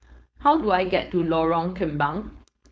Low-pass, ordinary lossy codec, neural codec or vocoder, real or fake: none; none; codec, 16 kHz, 4.8 kbps, FACodec; fake